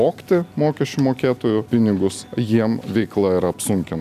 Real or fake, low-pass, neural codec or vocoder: real; 14.4 kHz; none